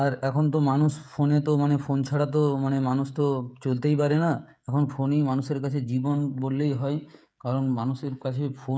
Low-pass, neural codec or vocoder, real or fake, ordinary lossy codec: none; codec, 16 kHz, 16 kbps, FreqCodec, smaller model; fake; none